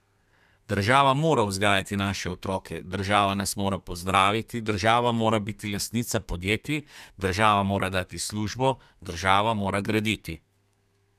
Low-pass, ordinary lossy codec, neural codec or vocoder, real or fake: 14.4 kHz; none; codec, 32 kHz, 1.9 kbps, SNAC; fake